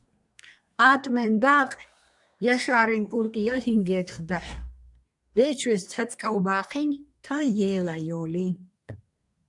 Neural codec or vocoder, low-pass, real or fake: codec, 24 kHz, 1 kbps, SNAC; 10.8 kHz; fake